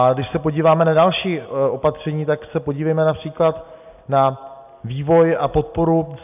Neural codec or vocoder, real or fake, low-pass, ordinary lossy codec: none; real; 3.6 kHz; AAC, 32 kbps